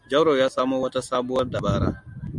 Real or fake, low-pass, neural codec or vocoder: real; 10.8 kHz; none